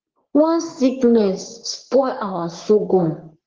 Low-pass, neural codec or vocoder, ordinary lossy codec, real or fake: 7.2 kHz; codec, 44.1 kHz, 3.4 kbps, Pupu-Codec; Opus, 16 kbps; fake